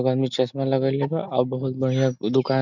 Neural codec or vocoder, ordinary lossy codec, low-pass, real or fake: none; none; 7.2 kHz; real